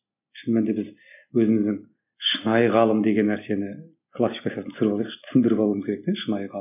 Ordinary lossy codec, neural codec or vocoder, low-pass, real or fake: none; none; 3.6 kHz; real